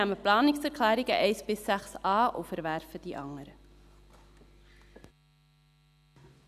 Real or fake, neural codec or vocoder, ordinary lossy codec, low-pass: real; none; none; 14.4 kHz